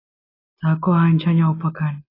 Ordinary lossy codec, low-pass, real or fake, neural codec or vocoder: AAC, 24 kbps; 5.4 kHz; real; none